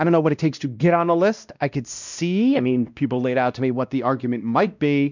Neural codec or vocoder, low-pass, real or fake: codec, 16 kHz, 1 kbps, X-Codec, WavLM features, trained on Multilingual LibriSpeech; 7.2 kHz; fake